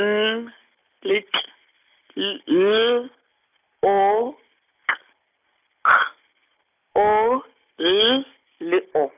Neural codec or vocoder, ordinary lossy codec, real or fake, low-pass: none; none; real; 3.6 kHz